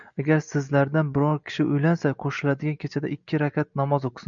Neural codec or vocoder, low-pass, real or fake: none; 7.2 kHz; real